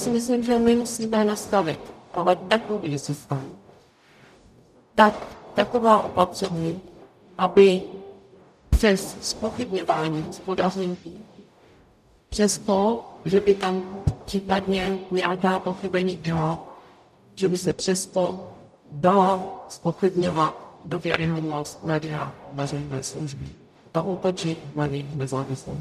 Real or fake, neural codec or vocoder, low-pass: fake; codec, 44.1 kHz, 0.9 kbps, DAC; 14.4 kHz